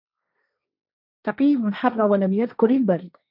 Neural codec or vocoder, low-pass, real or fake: codec, 16 kHz, 1.1 kbps, Voila-Tokenizer; 5.4 kHz; fake